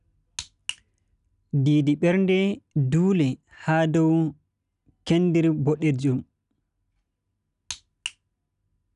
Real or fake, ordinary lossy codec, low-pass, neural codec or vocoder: real; none; 10.8 kHz; none